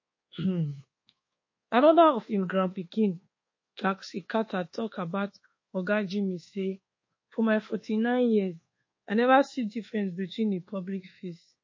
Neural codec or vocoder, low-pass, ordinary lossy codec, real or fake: codec, 24 kHz, 1.2 kbps, DualCodec; 7.2 kHz; MP3, 32 kbps; fake